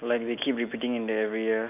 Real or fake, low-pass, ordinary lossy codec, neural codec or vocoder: real; 3.6 kHz; none; none